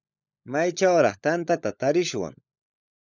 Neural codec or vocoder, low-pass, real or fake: codec, 16 kHz, 16 kbps, FunCodec, trained on LibriTTS, 50 frames a second; 7.2 kHz; fake